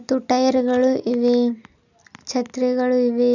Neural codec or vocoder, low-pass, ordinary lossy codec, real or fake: none; 7.2 kHz; none; real